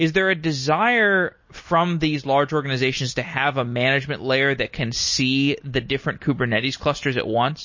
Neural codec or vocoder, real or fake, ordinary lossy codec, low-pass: none; real; MP3, 32 kbps; 7.2 kHz